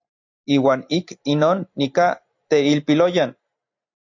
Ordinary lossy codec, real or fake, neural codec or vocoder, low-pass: AAC, 48 kbps; fake; vocoder, 44.1 kHz, 128 mel bands every 512 samples, BigVGAN v2; 7.2 kHz